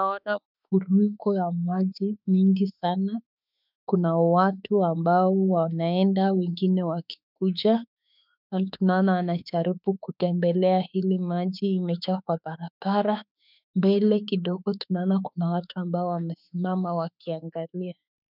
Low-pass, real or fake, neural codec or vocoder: 5.4 kHz; fake; autoencoder, 48 kHz, 32 numbers a frame, DAC-VAE, trained on Japanese speech